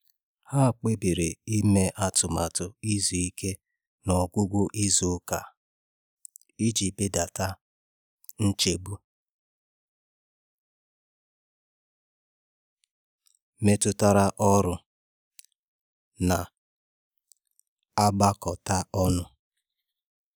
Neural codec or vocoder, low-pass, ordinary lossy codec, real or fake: none; none; none; real